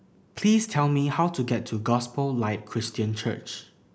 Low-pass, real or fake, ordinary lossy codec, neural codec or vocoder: none; real; none; none